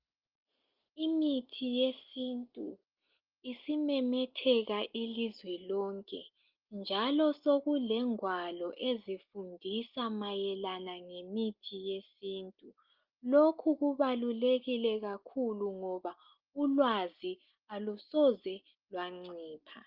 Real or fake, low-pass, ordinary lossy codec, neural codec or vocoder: real; 5.4 kHz; Opus, 32 kbps; none